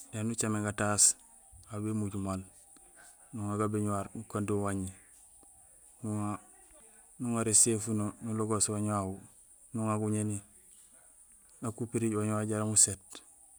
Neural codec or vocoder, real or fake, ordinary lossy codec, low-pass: none; real; none; none